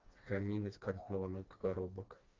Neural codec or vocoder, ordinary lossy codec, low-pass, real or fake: codec, 16 kHz, 2 kbps, FreqCodec, smaller model; Opus, 32 kbps; 7.2 kHz; fake